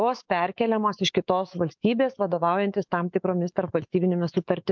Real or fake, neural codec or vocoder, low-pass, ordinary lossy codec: fake; autoencoder, 48 kHz, 128 numbers a frame, DAC-VAE, trained on Japanese speech; 7.2 kHz; MP3, 64 kbps